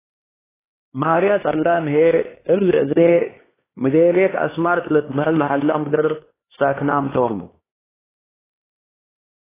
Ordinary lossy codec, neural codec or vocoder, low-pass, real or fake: AAC, 16 kbps; codec, 16 kHz, 2 kbps, X-Codec, HuBERT features, trained on LibriSpeech; 3.6 kHz; fake